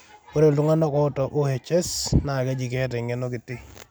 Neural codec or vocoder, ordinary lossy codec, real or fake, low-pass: none; none; real; none